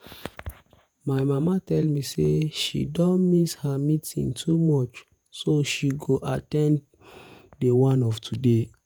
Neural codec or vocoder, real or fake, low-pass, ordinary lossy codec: vocoder, 48 kHz, 128 mel bands, Vocos; fake; none; none